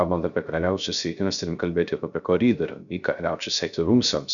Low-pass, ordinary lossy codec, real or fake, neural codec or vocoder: 7.2 kHz; MP3, 96 kbps; fake; codec, 16 kHz, 0.3 kbps, FocalCodec